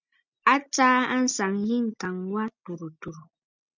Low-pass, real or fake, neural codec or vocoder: 7.2 kHz; real; none